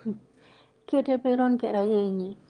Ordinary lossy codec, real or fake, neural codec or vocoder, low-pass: Opus, 24 kbps; fake; autoencoder, 22.05 kHz, a latent of 192 numbers a frame, VITS, trained on one speaker; 9.9 kHz